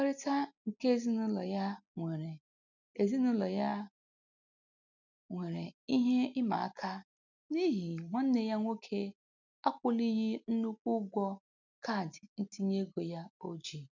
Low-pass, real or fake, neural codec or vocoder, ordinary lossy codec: 7.2 kHz; real; none; none